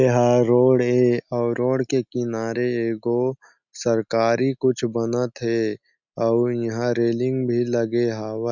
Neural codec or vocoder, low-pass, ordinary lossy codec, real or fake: none; 7.2 kHz; none; real